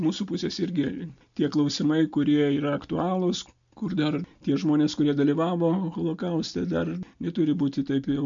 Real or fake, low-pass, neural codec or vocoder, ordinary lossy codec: real; 7.2 kHz; none; MP3, 64 kbps